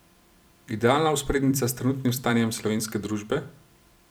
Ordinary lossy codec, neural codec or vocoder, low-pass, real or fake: none; vocoder, 44.1 kHz, 128 mel bands every 256 samples, BigVGAN v2; none; fake